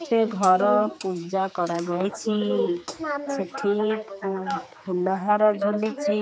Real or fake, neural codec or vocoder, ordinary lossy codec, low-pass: fake; codec, 16 kHz, 4 kbps, X-Codec, HuBERT features, trained on general audio; none; none